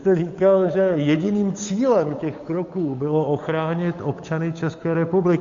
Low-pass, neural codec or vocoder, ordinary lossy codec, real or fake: 7.2 kHz; codec, 16 kHz, 8 kbps, FunCodec, trained on Chinese and English, 25 frames a second; MP3, 48 kbps; fake